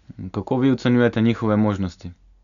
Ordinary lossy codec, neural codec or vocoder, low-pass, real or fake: none; none; 7.2 kHz; real